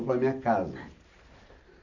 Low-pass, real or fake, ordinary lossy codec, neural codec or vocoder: 7.2 kHz; real; none; none